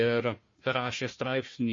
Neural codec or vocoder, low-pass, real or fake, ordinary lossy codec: codec, 16 kHz, 1 kbps, FunCodec, trained on Chinese and English, 50 frames a second; 7.2 kHz; fake; MP3, 32 kbps